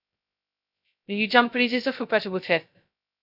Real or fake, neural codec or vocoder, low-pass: fake; codec, 16 kHz, 0.2 kbps, FocalCodec; 5.4 kHz